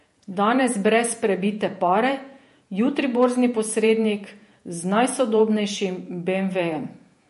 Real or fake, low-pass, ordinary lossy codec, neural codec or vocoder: fake; 14.4 kHz; MP3, 48 kbps; vocoder, 48 kHz, 128 mel bands, Vocos